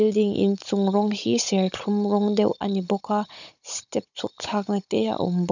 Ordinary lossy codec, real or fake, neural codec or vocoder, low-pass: none; fake; codec, 16 kHz, 16 kbps, FunCodec, trained on Chinese and English, 50 frames a second; 7.2 kHz